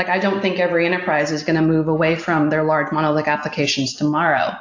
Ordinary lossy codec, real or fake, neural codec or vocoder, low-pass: AAC, 48 kbps; real; none; 7.2 kHz